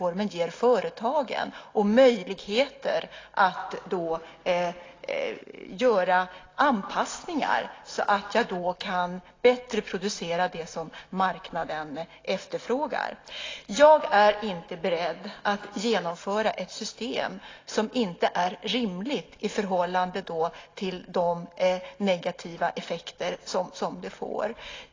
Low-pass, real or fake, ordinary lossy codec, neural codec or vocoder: 7.2 kHz; real; AAC, 32 kbps; none